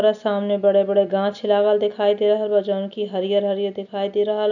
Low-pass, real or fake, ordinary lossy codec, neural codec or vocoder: 7.2 kHz; real; none; none